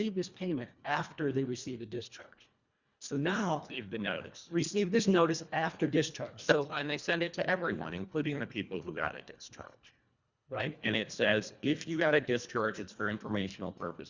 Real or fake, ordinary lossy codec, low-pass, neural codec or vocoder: fake; Opus, 64 kbps; 7.2 kHz; codec, 24 kHz, 1.5 kbps, HILCodec